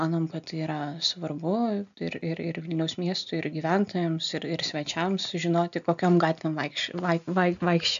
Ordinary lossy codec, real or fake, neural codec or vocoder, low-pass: AAC, 96 kbps; real; none; 7.2 kHz